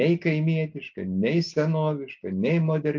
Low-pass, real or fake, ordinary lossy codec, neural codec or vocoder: 7.2 kHz; real; MP3, 64 kbps; none